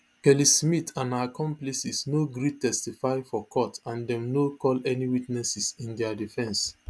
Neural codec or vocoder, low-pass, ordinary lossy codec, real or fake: none; none; none; real